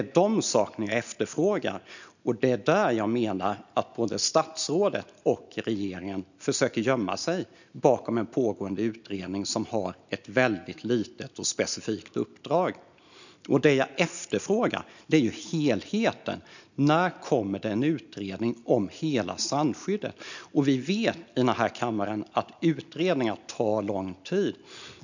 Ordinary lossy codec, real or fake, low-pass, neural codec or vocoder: none; real; 7.2 kHz; none